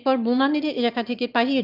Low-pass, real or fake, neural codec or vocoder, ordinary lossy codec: 5.4 kHz; fake; autoencoder, 22.05 kHz, a latent of 192 numbers a frame, VITS, trained on one speaker; none